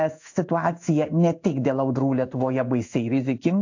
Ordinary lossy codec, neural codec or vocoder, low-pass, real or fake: AAC, 48 kbps; codec, 16 kHz in and 24 kHz out, 1 kbps, XY-Tokenizer; 7.2 kHz; fake